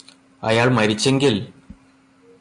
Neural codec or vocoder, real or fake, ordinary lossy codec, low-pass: none; real; MP3, 48 kbps; 10.8 kHz